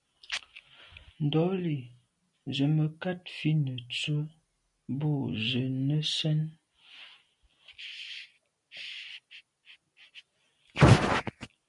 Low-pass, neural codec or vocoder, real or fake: 10.8 kHz; none; real